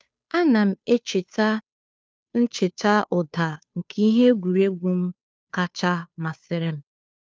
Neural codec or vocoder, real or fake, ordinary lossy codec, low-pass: codec, 16 kHz, 2 kbps, FunCodec, trained on Chinese and English, 25 frames a second; fake; none; none